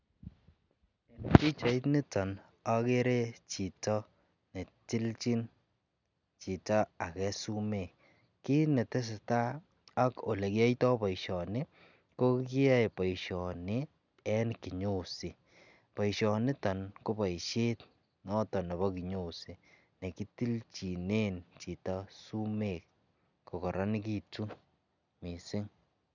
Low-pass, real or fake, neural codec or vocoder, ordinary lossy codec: 7.2 kHz; real; none; none